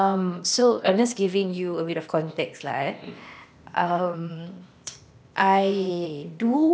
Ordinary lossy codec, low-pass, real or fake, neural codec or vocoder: none; none; fake; codec, 16 kHz, 0.8 kbps, ZipCodec